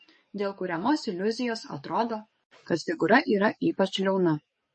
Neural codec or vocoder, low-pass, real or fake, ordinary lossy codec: codec, 44.1 kHz, 7.8 kbps, DAC; 10.8 kHz; fake; MP3, 32 kbps